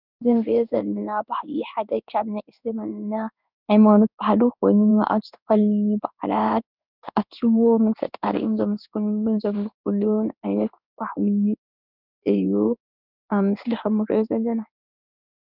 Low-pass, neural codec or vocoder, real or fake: 5.4 kHz; codec, 16 kHz in and 24 kHz out, 1 kbps, XY-Tokenizer; fake